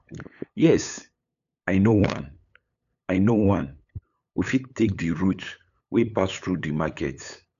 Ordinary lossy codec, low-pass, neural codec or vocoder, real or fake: none; 7.2 kHz; codec, 16 kHz, 8 kbps, FunCodec, trained on LibriTTS, 25 frames a second; fake